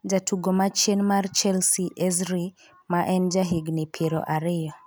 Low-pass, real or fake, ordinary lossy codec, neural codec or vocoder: none; real; none; none